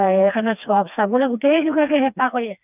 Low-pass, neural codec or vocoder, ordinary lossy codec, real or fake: 3.6 kHz; codec, 16 kHz, 2 kbps, FreqCodec, smaller model; none; fake